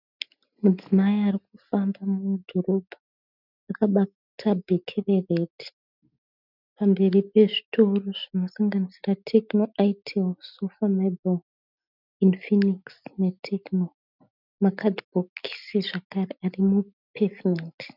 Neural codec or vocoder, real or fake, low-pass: none; real; 5.4 kHz